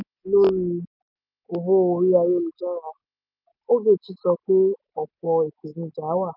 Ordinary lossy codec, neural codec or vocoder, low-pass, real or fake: none; none; 5.4 kHz; real